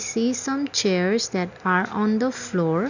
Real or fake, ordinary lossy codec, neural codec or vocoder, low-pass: real; none; none; 7.2 kHz